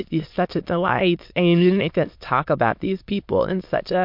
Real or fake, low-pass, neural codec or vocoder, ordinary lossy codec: fake; 5.4 kHz; autoencoder, 22.05 kHz, a latent of 192 numbers a frame, VITS, trained on many speakers; MP3, 48 kbps